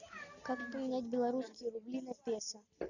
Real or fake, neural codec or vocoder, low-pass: real; none; 7.2 kHz